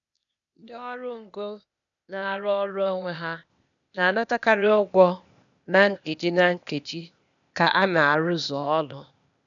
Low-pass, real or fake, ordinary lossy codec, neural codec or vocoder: 7.2 kHz; fake; none; codec, 16 kHz, 0.8 kbps, ZipCodec